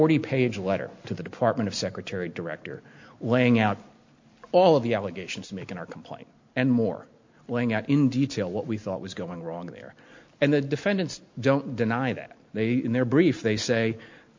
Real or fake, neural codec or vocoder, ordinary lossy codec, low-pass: real; none; MP3, 48 kbps; 7.2 kHz